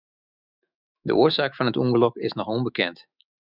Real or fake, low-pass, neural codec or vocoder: fake; 5.4 kHz; autoencoder, 48 kHz, 128 numbers a frame, DAC-VAE, trained on Japanese speech